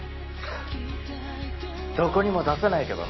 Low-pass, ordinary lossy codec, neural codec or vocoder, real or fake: 7.2 kHz; MP3, 24 kbps; none; real